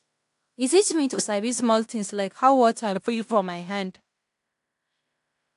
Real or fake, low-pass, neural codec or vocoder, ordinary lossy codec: fake; 10.8 kHz; codec, 16 kHz in and 24 kHz out, 0.9 kbps, LongCat-Audio-Codec, fine tuned four codebook decoder; AAC, 64 kbps